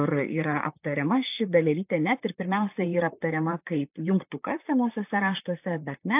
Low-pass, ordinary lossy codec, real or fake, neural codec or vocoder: 3.6 kHz; AAC, 32 kbps; fake; vocoder, 44.1 kHz, 128 mel bands, Pupu-Vocoder